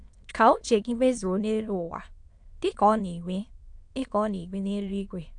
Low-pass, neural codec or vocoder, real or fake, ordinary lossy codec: 9.9 kHz; autoencoder, 22.05 kHz, a latent of 192 numbers a frame, VITS, trained on many speakers; fake; AAC, 64 kbps